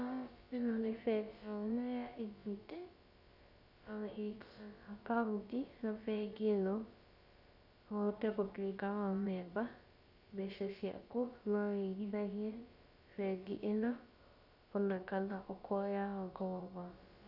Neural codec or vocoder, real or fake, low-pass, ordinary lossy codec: codec, 16 kHz, about 1 kbps, DyCAST, with the encoder's durations; fake; 5.4 kHz; Opus, 64 kbps